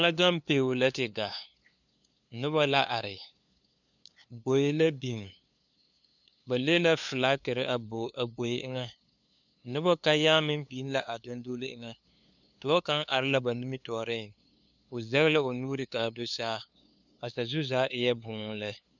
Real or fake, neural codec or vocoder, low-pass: fake; codec, 16 kHz, 2 kbps, FunCodec, trained on LibriTTS, 25 frames a second; 7.2 kHz